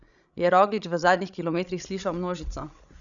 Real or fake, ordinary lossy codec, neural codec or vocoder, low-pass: fake; none; codec, 16 kHz, 16 kbps, FreqCodec, larger model; 7.2 kHz